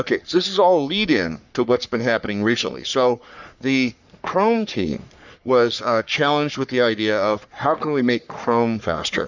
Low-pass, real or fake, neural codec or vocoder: 7.2 kHz; fake; codec, 44.1 kHz, 3.4 kbps, Pupu-Codec